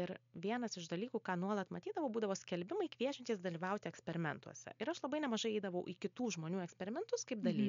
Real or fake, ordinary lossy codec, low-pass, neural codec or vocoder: real; MP3, 64 kbps; 7.2 kHz; none